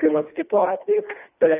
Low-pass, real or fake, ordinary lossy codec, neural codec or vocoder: 3.6 kHz; fake; AAC, 24 kbps; codec, 24 kHz, 1.5 kbps, HILCodec